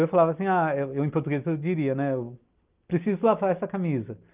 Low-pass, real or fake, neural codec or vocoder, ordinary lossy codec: 3.6 kHz; real; none; Opus, 32 kbps